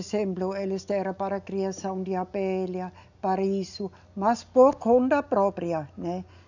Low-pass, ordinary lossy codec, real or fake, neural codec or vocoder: 7.2 kHz; none; real; none